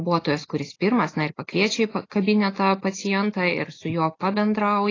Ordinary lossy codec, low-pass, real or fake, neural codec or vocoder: AAC, 32 kbps; 7.2 kHz; real; none